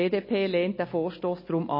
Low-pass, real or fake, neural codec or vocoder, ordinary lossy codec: 5.4 kHz; real; none; MP3, 24 kbps